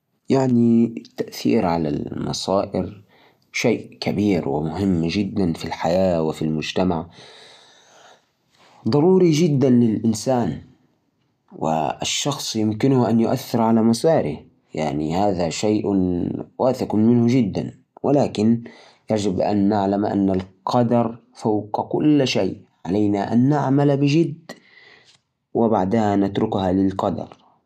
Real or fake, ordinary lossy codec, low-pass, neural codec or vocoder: real; none; 14.4 kHz; none